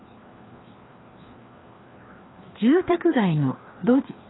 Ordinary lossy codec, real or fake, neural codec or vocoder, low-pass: AAC, 16 kbps; fake; codec, 16 kHz, 2 kbps, FreqCodec, larger model; 7.2 kHz